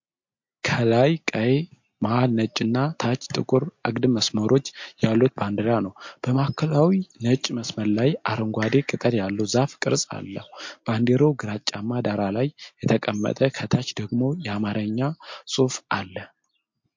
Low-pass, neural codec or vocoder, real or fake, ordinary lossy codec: 7.2 kHz; none; real; MP3, 48 kbps